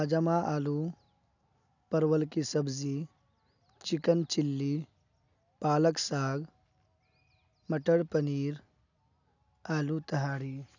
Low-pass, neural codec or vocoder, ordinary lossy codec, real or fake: 7.2 kHz; none; none; real